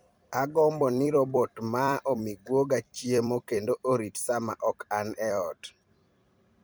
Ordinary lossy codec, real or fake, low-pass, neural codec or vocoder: none; fake; none; vocoder, 44.1 kHz, 128 mel bands every 256 samples, BigVGAN v2